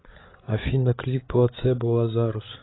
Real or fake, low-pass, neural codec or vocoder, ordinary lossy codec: fake; 7.2 kHz; codec, 16 kHz, 8 kbps, FreqCodec, larger model; AAC, 16 kbps